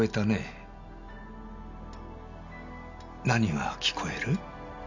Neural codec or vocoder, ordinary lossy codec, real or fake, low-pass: none; none; real; 7.2 kHz